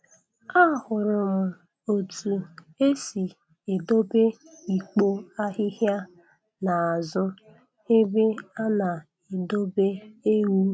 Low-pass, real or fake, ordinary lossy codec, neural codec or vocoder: none; real; none; none